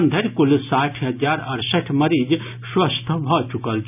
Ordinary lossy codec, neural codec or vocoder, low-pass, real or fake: none; none; 3.6 kHz; real